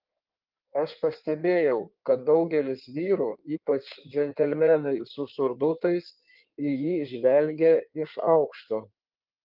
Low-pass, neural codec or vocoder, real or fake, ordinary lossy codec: 5.4 kHz; codec, 16 kHz in and 24 kHz out, 1.1 kbps, FireRedTTS-2 codec; fake; Opus, 24 kbps